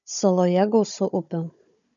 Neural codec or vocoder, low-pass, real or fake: codec, 16 kHz, 16 kbps, FunCodec, trained on Chinese and English, 50 frames a second; 7.2 kHz; fake